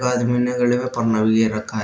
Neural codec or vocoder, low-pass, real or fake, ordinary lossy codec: none; none; real; none